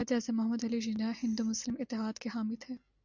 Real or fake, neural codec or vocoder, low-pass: real; none; 7.2 kHz